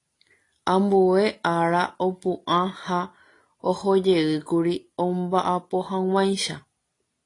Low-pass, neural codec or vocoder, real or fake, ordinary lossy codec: 10.8 kHz; none; real; AAC, 32 kbps